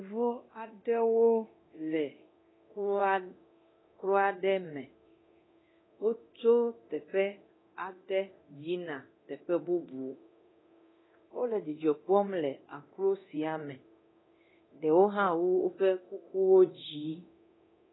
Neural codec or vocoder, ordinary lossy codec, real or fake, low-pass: codec, 24 kHz, 0.9 kbps, DualCodec; AAC, 16 kbps; fake; 7.2 kHz